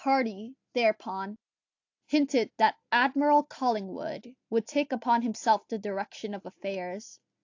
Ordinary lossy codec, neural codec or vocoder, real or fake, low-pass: AAC, 48 kbps; none; real; 7.2 kHz